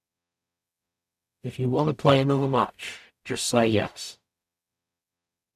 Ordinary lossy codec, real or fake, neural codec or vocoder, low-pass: none; fake; codec, 44.1 kHz, 0.9 kbps, DAC; 14.4 kHz